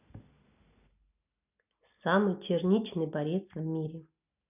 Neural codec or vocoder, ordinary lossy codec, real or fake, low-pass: none; none; real; 3.6 kHz